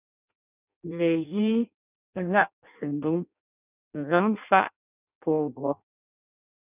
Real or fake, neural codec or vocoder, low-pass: fake; codec, 16 kHz in and 24 kHz out, 0.6 kbps, FireRedTTS-2 codec; 3.6 kHz